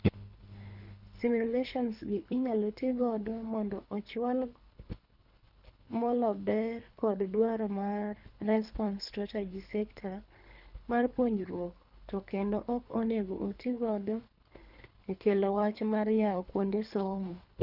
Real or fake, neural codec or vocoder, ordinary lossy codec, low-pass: fake; codec, 24 kHz, 3 kbps, HILCodec; none; 5.4 kHz